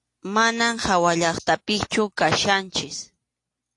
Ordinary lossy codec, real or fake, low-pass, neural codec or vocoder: AAC, 48 kbps; real; 10.8 kHz; none